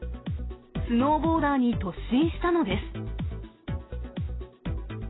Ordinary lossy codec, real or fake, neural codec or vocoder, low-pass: AAC, 16 kbps; real; none; 7.2 kHz